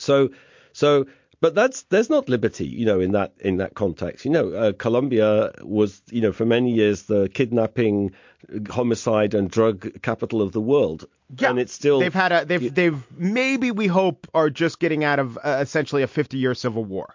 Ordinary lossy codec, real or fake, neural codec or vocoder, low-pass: MP3, 48 kbps; real; none; 7.2 kHz